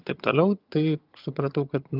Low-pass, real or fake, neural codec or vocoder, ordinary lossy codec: 5.4 kHz; fake; vocoder, 22.05 kHz, 80 mel bands, HiFi-GAN; Opus, 24 kbps